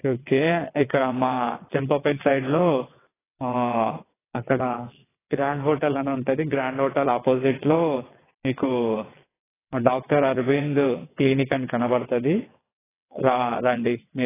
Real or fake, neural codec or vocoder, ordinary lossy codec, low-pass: fake; vocoder, 22.05 kHz, 80 mel bands, WaveNeXt; AAC, 16 kbps; 3.6 kHz